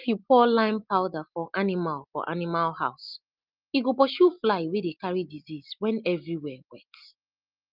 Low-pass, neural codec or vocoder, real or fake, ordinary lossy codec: 5.4 kHz; none; real; Opus, 32 kbps